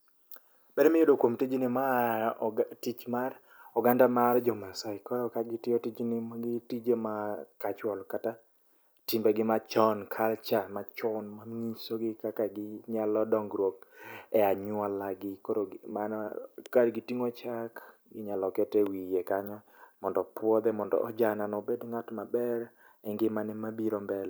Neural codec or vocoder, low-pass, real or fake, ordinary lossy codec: none; none; real; none